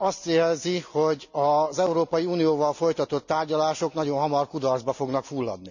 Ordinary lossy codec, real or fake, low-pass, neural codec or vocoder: none; real; 7.2 kHz; none